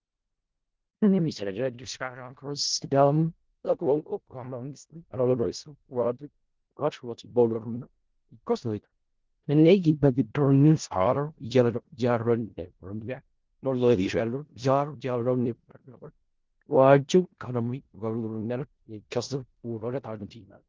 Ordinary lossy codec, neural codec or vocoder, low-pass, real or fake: Opus, 16 kbps; codec, 16 kHz in and 24 kHz out, 0.4 kbps, LongCat-Audio-Codec, four codebook decoder; 7.2 kHz; fake